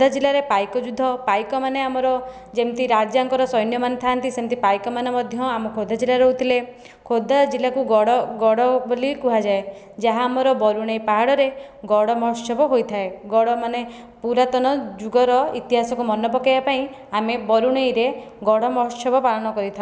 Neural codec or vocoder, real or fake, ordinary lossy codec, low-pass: none; real; none; none